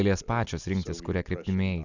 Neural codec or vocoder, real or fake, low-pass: none; real; 7.2 kHz